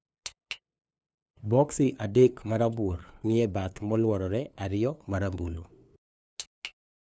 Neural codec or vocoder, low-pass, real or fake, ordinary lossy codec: codec, 16 kHz, 2 kbps, FunCodec, trained on LibriTTS, 25 frames a second; none; fake; none